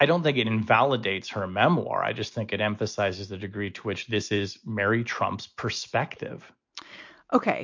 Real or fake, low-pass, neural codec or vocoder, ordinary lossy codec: real; 7.2 kHz; none; MP3, 48 kbps